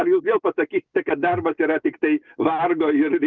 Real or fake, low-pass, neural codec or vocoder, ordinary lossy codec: real; 7.2 kHz; none; Opus, 24 kbps